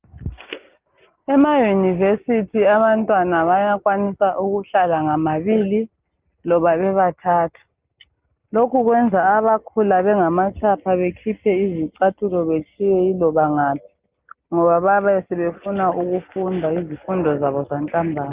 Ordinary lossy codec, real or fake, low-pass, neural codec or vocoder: Opus, 32 kbps; real; 3.6 kHz; none